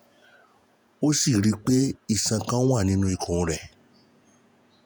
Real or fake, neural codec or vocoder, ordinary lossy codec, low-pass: fake; vocoder, 48 kHz, 128 mel bands, Vocos; none; none